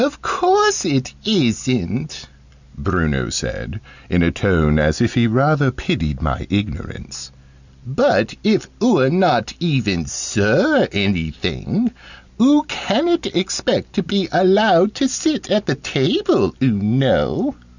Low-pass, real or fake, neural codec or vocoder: 7.2 kHz; real; none